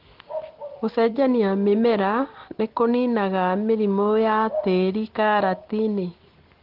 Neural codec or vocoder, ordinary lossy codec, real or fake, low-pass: none; Opus, 16 kbps; real; 5.4 kHz